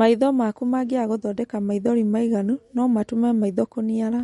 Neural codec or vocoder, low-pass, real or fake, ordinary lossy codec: none; 14.4 kHz; real; MP3, 48 kbps